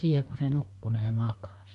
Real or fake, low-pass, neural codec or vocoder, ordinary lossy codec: fake; 10.8 kHz; codec, 24 kHz, 1 kbps, SNAC; AAC, 64 kbps